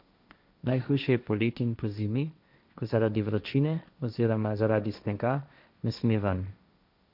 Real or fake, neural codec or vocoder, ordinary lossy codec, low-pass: fake; codec, 16 kHz, 1.1 kbps, Voila-Tokenizer; none; 5.4 kHz